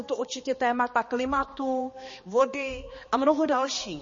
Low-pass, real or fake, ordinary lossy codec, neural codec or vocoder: 7.2 kHz; fake; MP3, 32 kbps; codec, 16 kHz, 2 kbps, X-Codec, HuBERT features, trained on balanced general audio